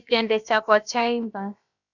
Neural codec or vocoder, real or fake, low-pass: codec, 16 kHz, about 1 kbps, DyCAST, with the encoder's durations; fake; 7.2 kHz